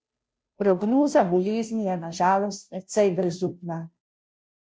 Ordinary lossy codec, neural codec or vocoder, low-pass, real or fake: none; codec, 16 kHz, 0.5 kbps, FunCodec, trained on Chinese and English, 25 frames a second; none; fake